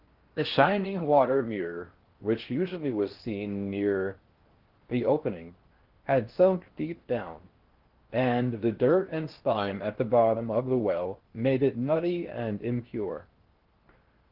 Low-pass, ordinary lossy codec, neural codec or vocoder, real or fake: 5.4 kHz; Opus, 16 kbps; codec, 16 kHz in and 24 kHz out, 0.6 kbps, FocalCodec, streaming, 4096 codes; fake